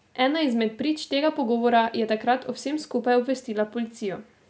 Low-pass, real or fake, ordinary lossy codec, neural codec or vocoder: none; real; none; none